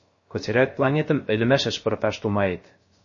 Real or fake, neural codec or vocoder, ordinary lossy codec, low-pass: fake; codec, 16 kHz, 0.3 kbps, FocalCodec; MP3, 32 kbps; 7.2 kHz